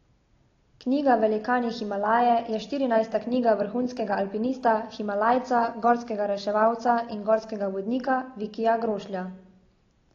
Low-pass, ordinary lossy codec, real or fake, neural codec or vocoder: 7.2 kHz; AAC, 32 kbps; real; none